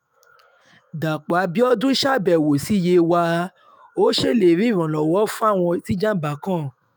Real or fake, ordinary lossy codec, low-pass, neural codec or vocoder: fake; none; none; autoencoder, 48 kHz, 128 numbers a frame, DAC-VAE, trained on Japanese speech